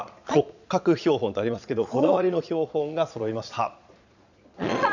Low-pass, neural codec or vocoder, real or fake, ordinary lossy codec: 7.2 kHz; vocoder, 22.05 kHz, 80 mel bands, Vocos; fake; none